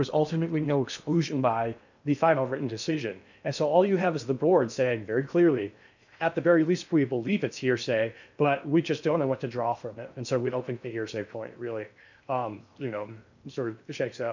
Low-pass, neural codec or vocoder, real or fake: 7.2 kHz; codec, 16 kHz in and 24 kHz out, 0.6 kbps, FocalCodec, streaming, 2048 codes; fake